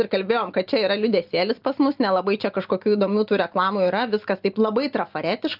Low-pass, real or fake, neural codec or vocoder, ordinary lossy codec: 5.4 kHz; real; none; Opus, 24 kbps